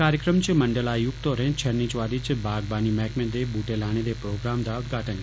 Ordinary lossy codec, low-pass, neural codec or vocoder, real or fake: none; none; none; real